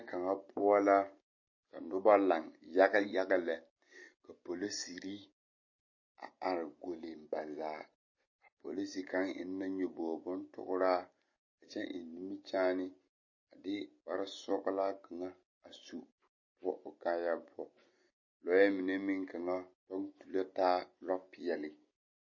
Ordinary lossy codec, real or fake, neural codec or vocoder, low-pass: MP3, 32 kbps; real; none; 7.2 kHz